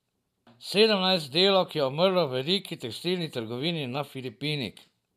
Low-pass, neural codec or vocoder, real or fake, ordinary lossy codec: 14.4 kHz; vocoder, 44.1 kHz, 128 mel bands every 512 samples, BigVGAN v2; fake; none